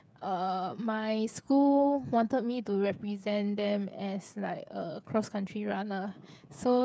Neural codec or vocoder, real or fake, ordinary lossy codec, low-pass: codec, 16 kHz, 8 kbps, FreqCodec, smaller model; fake; none; none